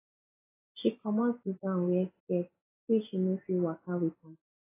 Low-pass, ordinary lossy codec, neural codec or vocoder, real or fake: 3.6 kHz; AAC, 16 kbps; none; real